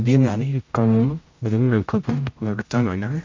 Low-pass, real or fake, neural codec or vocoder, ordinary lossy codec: 7.2 kHz; fake; codec, 16 kHz, 0.5 kbps, X-Codec, HuBERT features, trained on general audio; MP3, 48 kbps